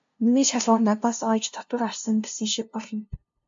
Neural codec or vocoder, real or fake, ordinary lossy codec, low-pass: codec, 16 kHz, 0.5 kbps, FunCodec, trained on LibriTTS, 25 frames a second; fake; MP3, 48 kbps; 7.2 kHz